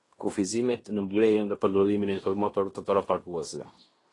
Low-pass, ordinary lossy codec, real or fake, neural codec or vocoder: 10.8 kHz; AAC, 32 kbps; fake; codec, 16 kHz in and 24 kHz out, 0.9 kbps, LongCat-Audio-Codec, fine tuned four codebook decoder